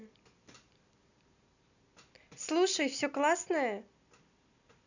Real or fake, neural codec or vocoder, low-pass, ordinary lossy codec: real; none; 7.2 kHz; none